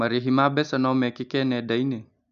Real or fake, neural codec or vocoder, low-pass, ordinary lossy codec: real; none; 7.2 kHz; none